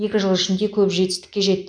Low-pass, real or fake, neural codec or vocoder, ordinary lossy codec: 9.9 kHz; real; none; none